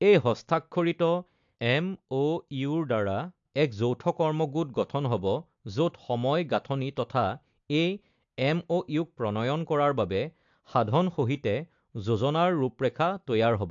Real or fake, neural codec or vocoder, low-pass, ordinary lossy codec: real; none; 7.2 kHz; AAC, 64 kbps